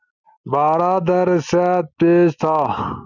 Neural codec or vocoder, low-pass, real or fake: none; 7.2 kHz; real